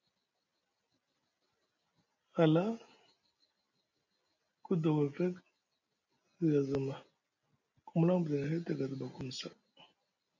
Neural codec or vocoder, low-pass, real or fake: none; 7.2 kHz; real